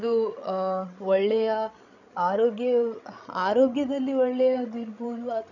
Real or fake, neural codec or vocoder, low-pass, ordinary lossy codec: fake; codec, 16 kHz, 16 kbps, FreqCodec, larger model; 7.2 kHz; none